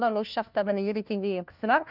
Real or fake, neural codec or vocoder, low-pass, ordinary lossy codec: fake; codec, 16 kHz, 1 kbps, FunCodec, trained on LibriTTS, 50 frames a second; 5.4 kHz; none